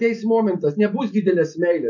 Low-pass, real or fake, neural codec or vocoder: 7.2 kHz; real; none